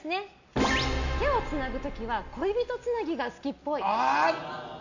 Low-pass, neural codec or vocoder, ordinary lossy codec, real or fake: 7.2 kHz; none; none; real